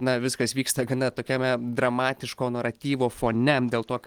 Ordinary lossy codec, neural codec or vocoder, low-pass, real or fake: Opus, 24 kbps; none; 19.8 kHz; real